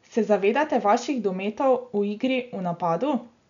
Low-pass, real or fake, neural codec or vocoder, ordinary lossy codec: 7.2 kHz; real; none; none